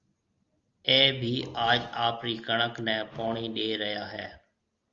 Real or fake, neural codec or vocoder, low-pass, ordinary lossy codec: real; none; 7.2 kHz; Opus, 32 kbps